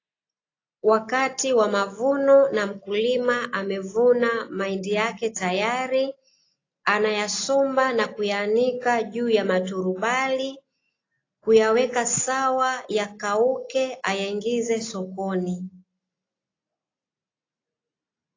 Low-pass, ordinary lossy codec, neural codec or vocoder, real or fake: 7.2 kHz; AAC, 32 kbps; none; real